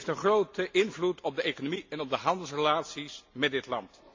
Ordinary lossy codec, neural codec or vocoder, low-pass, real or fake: MP3, 48 kbps; none; 7.2 kHz; real